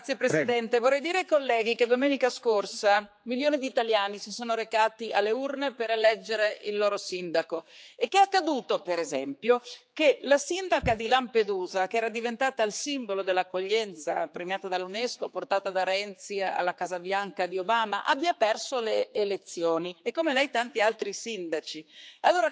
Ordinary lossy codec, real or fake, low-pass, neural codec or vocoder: none; fake; none; codec, 16 kHz, 4 kbps, X-Codec, HuBERT features, trained on general audio